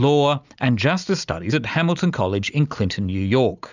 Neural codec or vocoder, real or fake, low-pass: none; real; 7.2 kHz